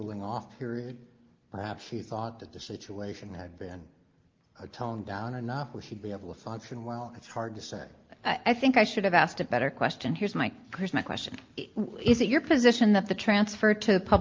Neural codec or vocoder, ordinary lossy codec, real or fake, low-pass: none; Opus, 32 kbps; real; 7.2 kHz